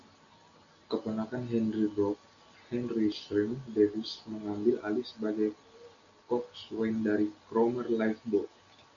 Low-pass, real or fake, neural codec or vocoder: 7.2 kHz; real; none